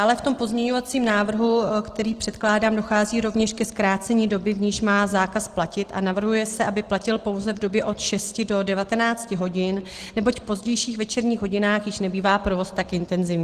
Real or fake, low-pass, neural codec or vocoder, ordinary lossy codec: real; 14.4 kHz; none; Opus, 16 kbps